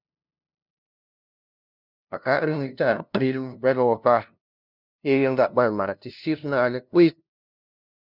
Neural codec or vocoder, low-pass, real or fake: codec, 16 kHz, 0.5 kbps, FunCodec, trained on LibriTTS, 25 frames a second; 5.4 kHz; fake